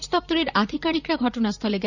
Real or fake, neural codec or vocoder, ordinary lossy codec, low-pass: fake; vocoder, 44.1 kHz, 128 mel bands, Pupu-Vocoder; none; 7.2 kHz